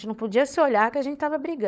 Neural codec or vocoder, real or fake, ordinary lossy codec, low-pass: codec, 16 kHz, 16 kbps, FunCodec, trained on LibriTTS, 50 frames a second; fake; none; none